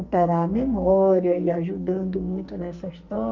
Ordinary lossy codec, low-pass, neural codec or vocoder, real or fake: Opus, 64 kbps; 7.2 kHz; codec, 44.1 kHz, 2.6 kbps, SNAC; fake